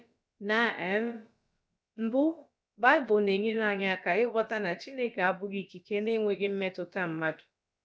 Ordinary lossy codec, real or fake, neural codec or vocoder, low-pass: none; fake; codec, 16 kHz, about 1 kbps, DyCAST, with the encoder's durations; none